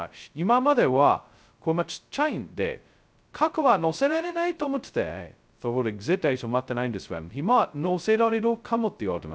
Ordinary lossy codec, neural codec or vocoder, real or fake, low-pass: none; codec, 16 kHz, 0.2 kbps, FocalCodec; fake; none